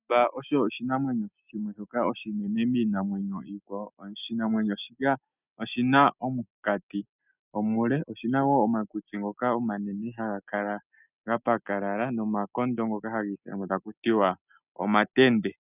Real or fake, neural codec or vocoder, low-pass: real; none; 3.6 kHz